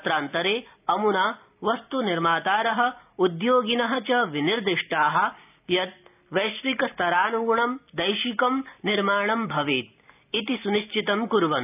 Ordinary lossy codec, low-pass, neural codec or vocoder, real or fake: none; 3.6 kHz; none; real